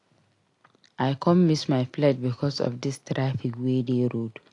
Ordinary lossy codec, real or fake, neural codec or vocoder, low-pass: AAC, 48 kbps; real; none; 10.8 kHz